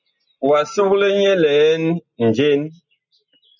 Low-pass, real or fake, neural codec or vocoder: 7.2 kHz; real; none